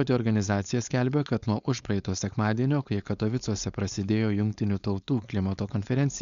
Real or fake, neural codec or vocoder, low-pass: fake; codec, 16 kHz, 4.8 kbps, FACodec; 7.2 kHz